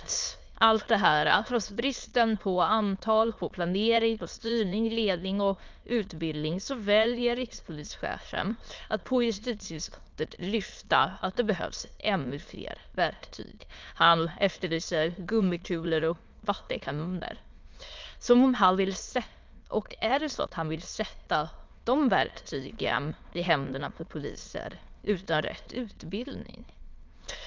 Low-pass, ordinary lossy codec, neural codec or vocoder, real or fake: 7.2 kHz; Opus, 24 kbps; autoencoder, 22.05 kHz, a latent of 192 numbers a frame, VITS, trained on many speakers; fake